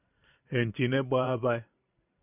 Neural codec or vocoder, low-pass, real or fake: vocoder, 44.1 kHz, 128 mel bands every 512 samples, BigVGAN v2; 3.6 kHz; fake